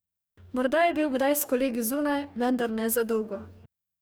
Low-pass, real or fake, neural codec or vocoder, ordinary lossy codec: none; fake; codec, 44.1 kHz, 2.6 kbps, DAC; none